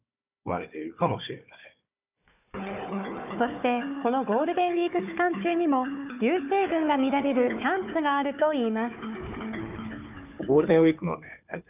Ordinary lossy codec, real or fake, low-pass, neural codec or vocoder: none; fake; 3.6 kHz; codec, 16 kHz, 4 kbps, FunCodec, trained on Chinese and English, 50 frames a second